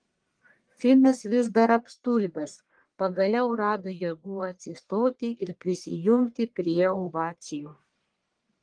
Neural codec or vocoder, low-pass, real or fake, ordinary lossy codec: codec, 44.1 kHz, 1.7 kbps, Pupu-Codec; 9.9 kHz; fake; Opus, 24 kbps